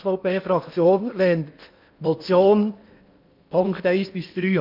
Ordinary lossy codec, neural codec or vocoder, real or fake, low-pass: MP3, 48 kbps; codec, 16 kHz in and 24 kHz out, 0.8 kbps, FocalCodec, streaming, 65536 codes; fake; 5.4 kHz